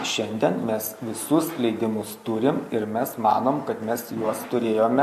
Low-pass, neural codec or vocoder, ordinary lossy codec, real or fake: 14.4 kHz; none; MP3, 64 kbps; real